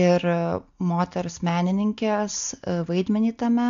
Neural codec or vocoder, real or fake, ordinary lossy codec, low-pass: none; real; AAC, 64 kbps; 7.2 kHz